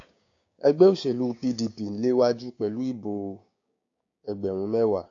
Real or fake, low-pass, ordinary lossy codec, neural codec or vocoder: fake; 7.2 kHz; AAC, 48 kbps; codec, 16 kHz, 6 kbps, DAC